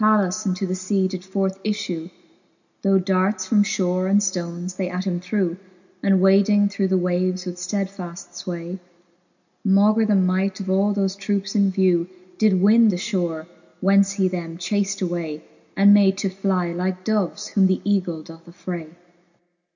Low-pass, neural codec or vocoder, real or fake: 7.2 kHz; none; real